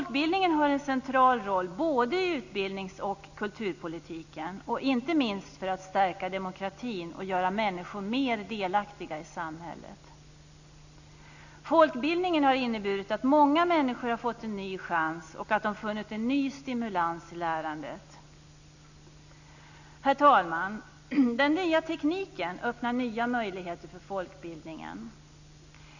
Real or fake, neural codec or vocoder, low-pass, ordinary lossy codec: real; none; 7.2 kHz; none